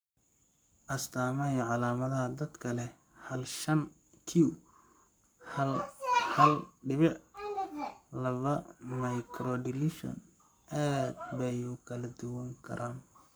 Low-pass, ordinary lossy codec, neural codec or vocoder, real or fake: none; none; codec, 44.1 kHz, 7.8 kbps, Pupu-Codec; fake